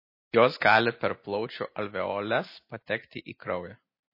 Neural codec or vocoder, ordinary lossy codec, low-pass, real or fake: none; MP3, 24 kbps; 5.4 kHz; real